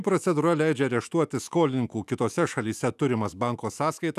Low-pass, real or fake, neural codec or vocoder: 14.4 kHz; fake; autoencoder, 48 kHz, 128 numbers a frame, DAC-VAE, trained on Japanese speech